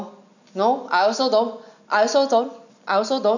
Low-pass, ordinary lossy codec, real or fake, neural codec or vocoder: 7.2 kHz; none; real; none